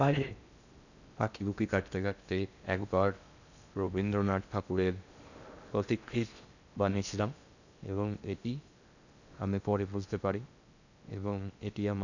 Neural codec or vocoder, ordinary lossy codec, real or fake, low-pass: codec, 16 kHz in and 24 kHz out, 0.6 kbps, FocalCodec, streaming, 4096 codes; none; fake; 7.2 kHz